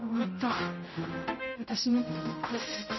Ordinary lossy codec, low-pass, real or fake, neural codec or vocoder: MP3, 24 kbps; 7.2 kHz; fake; codec, 16 kHz, 0.5 kbps, X-Codec, HuBERT features, trained on general audio